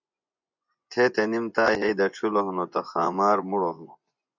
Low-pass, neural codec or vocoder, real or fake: 7.2 kHz; vocoder, 24 kHz, 100 mel bands, Vocos; fake